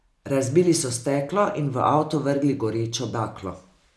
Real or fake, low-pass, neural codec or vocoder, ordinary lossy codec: real; none; none; none